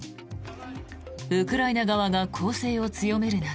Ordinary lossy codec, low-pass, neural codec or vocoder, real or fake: none; none; none; real